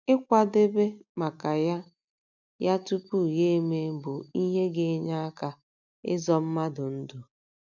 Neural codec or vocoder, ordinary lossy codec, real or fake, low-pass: none; none; real; 7.2 kHz